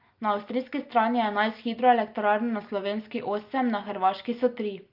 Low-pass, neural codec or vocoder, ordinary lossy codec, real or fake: 5.4 kHz; none; Opus, 24 kbps; real